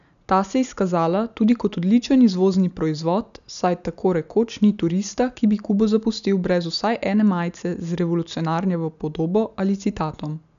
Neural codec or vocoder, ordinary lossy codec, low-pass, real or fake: none; none; 7.2 kHz; real